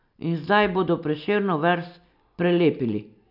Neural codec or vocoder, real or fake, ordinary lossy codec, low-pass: none; real; none; 5.4 kHz